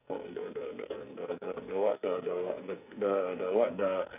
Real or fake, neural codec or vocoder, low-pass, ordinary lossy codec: fake; codec, 44.1 kHz, 2.6 kbps, SNAC; 3.6 kHz; none